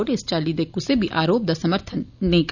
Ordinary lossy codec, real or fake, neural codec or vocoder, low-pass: none; real; none; 7.2 kHz